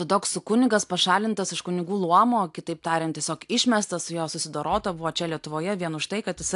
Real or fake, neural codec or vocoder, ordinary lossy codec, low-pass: real; none; AAC, 64 kbps; 10.8 kHz